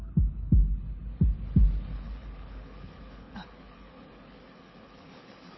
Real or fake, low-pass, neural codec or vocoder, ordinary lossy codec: fake; 7.2 kHz; codec, 24 kHz, 6 kbps, HILCodec; MP3, 24 kbps